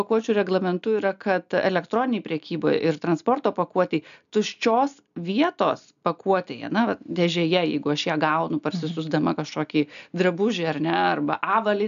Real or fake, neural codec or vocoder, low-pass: real; none; 7.2 kHz